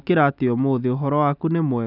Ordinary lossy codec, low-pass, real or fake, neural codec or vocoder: none; 5.4 kHz; real; none